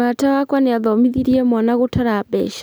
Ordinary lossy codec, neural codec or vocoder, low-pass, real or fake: none; none; none; real